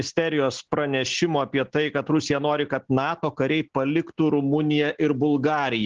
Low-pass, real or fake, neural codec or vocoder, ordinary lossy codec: 7.2 kHz; real; none; Opus, 16 kbps